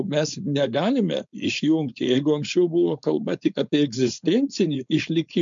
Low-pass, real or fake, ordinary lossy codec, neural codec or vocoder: 7.2 kHz; fake; MP3, 64 kbps; codec, 16 kHz, 4.8 kbps, FACodec